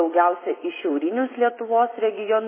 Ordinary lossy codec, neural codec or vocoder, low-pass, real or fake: MP3, 16 kbps; vocoder, 24 kHz, 100 mel bands, Vocos; 3.6 kHz; fake